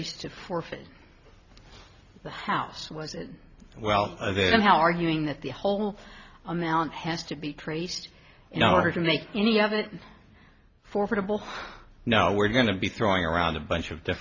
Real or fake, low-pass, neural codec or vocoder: real; 7.2 kHz; none